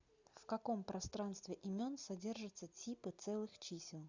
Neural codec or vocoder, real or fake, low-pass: none; real; 7.2 kHz